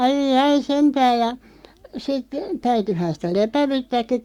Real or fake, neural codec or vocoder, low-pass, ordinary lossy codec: fake; codec, 44.1 kHz, 7.8 kbps, Pupu-Codec; 19.8 kHz; Opus, 64 kbps